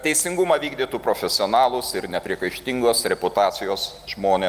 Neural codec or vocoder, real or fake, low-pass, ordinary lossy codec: none; real; 14.4 kHz; Opus, 32 kbps